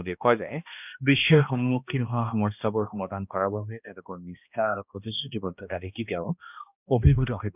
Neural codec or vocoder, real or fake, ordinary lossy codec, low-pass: codec, 16 kHz, 1 kbps, X-Codec, HuBERT features, trained on balanced general audio; fake; none; 3.6 kHz